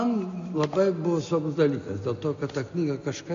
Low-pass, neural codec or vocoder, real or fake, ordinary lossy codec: 7.2 kHz; none; real; MP3, 48 kbps